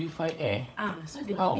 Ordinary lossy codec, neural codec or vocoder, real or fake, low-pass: none; codec, 16 kHz, 16 kbps, FunCodec, trained on LibriTTS, 50 frames a second; fake; none